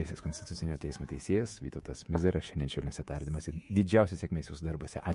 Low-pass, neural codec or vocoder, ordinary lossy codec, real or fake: 10.8 kHz; codec, 24 kHz, 3.1 kbps, DualCodec; MP3, 48 kbps; fake